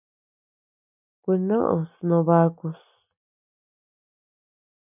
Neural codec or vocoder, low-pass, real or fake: none; 3.6 kHz; real